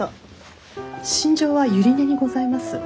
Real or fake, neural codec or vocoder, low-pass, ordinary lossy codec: real; none; none; none